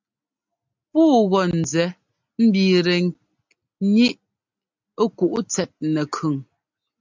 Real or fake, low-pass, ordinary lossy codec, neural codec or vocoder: real; 7.2 kHz; MP3, 64 kbps; none